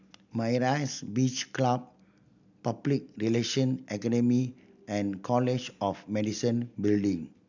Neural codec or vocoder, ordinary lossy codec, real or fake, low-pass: none; none; real; 7.2 kHz